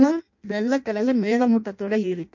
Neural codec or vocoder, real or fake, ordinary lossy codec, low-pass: codec, 16 kHz in and 24 kHz out, 0.6 kbps, FireRedTTS-2 codec; fake; MP3, 48 kbps; 7.2 kHz